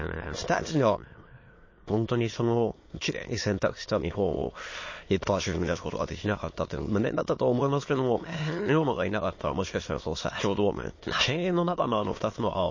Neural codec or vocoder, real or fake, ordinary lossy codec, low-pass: autoencoder, 22.05 kHz, a latent of 192 numbers a frame, VITS, trained on many speakers; fake; MP3, 32 kbps; 7.2 kHz